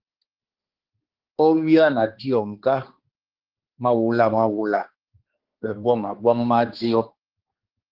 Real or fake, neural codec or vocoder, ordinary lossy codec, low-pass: fake; codec, 16 kHz, 2 kbps, X-Codec, HuBERT features, trained on balanced general audio; Opus, 16 kbps; 5.4 kHz